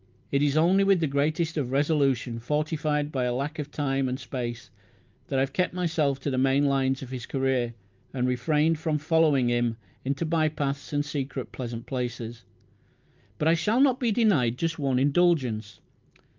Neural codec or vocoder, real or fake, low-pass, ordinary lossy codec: none; real; 7.2 kHz; Opus, 24 kbps